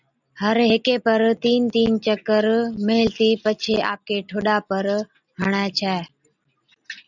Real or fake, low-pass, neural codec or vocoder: real; 7.2 kHz; none